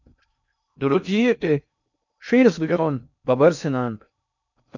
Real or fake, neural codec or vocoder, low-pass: fake; codec, 16 kHz in and 24 kHz out, 0.6 kbps, FocalCodec, streaming, 2048 codes; 7.2 kHz